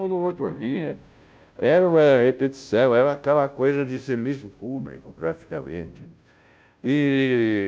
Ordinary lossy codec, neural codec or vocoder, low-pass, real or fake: none; codec, 16 kHz, 0.5 kbps, FunCodec, trained on Chinese and English, 25 frames a second; none; fake